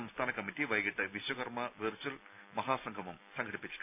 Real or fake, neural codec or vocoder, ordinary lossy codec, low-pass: real; none; none; 3.6 kHz